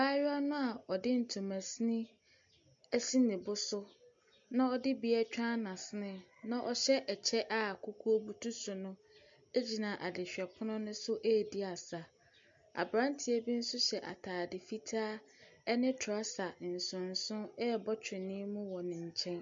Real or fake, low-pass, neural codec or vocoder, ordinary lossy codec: real; 7.2 kHz; none; MP3, 48 kbps